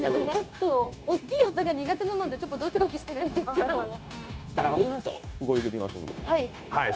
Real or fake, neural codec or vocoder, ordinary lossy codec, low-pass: fake; codec, 16 kHz, 0.9 kbps, LongCat-Audio-Codec; none; none